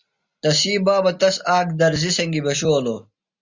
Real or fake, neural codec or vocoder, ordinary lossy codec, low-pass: real; none; Opus, 64 kbps; 7.2 kHz